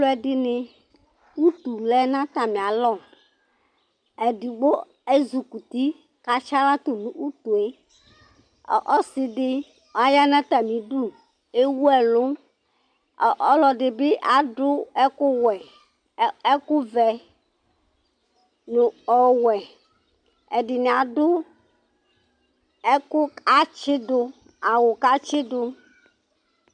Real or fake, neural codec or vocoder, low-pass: real; none; 9.9 kHz